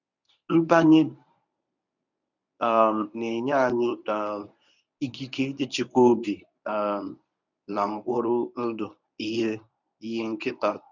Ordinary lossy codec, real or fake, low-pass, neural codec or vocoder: none; fake; 7.2 kHz; codec, 24 kHz, 0.9 kbps, WavTokenizer, medium speech release version 1